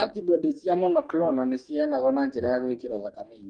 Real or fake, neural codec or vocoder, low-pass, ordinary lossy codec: fake; codec, 44.1 kHz, 2.6 kbps, DAC; 9.9 kHz; Opus, 32 kbps